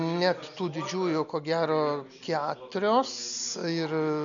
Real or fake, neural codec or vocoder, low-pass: real; none; 7.2 kHz